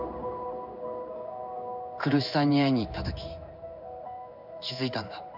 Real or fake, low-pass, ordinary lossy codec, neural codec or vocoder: fake; 5.4 kHz; none; codec, 16 kHz in and 24 kHz out, 1 kbps, XY-Tokenizer